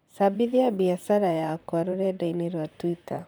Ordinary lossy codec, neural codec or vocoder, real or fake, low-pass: none; vocoder, 44.1 kHz, 128 mel bands, Pupu-Vocoder; fake; none